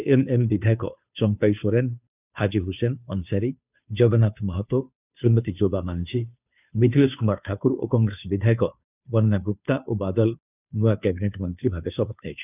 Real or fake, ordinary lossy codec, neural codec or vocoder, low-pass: fake; none; codec, 16 kHz, 2 kbps, FunCodec, trained on Chinese and English, 25 frames a second; 3.6 kHz